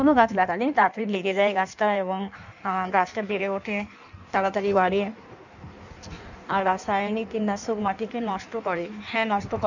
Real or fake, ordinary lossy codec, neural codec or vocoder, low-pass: fake; none; codec, 16 kHz in and 24 kHz out, 1.1 kbps, FireRedTTS-2 codec; 7.2 kHz